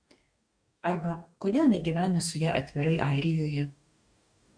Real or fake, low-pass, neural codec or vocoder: fake; 9.9 kHz; codec, 44.1 kHz, 2.6 kbps, DAC